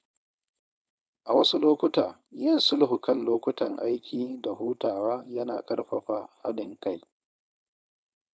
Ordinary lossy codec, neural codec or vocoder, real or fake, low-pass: none; codec, 16 kHz, 4.8 kbps, FACodec; fake; none